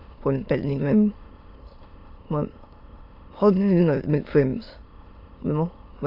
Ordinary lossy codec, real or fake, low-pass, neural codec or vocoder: AAC, 32 kbps; fake; 5.4 kHz; autoencoder, 22.05 kHz, a latent of 192 numbers a frame, VITS, trained on many speakers